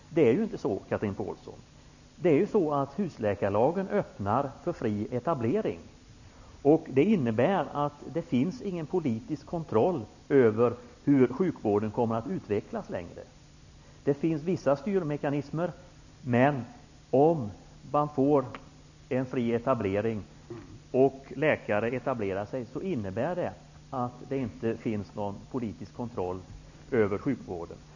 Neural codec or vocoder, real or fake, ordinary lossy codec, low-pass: none; real; none; 7.2 kHz